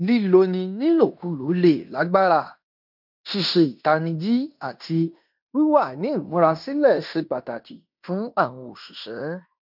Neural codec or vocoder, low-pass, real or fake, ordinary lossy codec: codec, 16 kHz in and 24 kHz out, 0.9 kbps, LongCat-Audio-Codec, fine tuned four codebook decoder; 5.4 kHz; fake; none